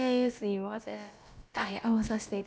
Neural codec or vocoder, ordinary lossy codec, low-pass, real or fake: codec, 16 kHz, about 1 kbps, DyCAST, with the encoder's durations; none; none; fake